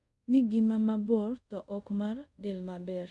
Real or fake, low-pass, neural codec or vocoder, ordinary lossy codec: fake; 10.8 kHz; codec, 24 kHz, 0.5 kbps, DualCodec; none